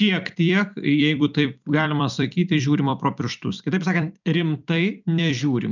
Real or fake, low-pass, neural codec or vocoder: fake; 7.2 kHz; vocoder, 44.1 kHz, 128 mel bands every 256 samples, BigVGAN v2